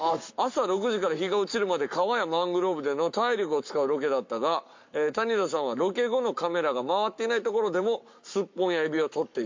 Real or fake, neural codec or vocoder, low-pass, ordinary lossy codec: fake; codec, 44.1 kHz, 7.8 kbps, Pupu-Codec; 7.2 kHz; MP3, 48 kbps